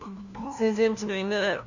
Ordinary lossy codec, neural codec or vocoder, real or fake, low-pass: none; codec, 16 kHz, 1 kbps, FunCodec, trained on LibriTTS, 50 frames a second; fake; 7.2 kHz